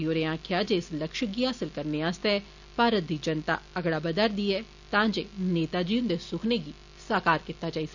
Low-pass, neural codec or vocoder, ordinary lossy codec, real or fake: 7.2 kHz; none; none; real